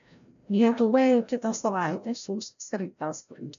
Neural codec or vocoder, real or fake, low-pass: codec, 16 kHz, 0.5 kbps, FreqCodec, larger model; fake; 7.2 kHz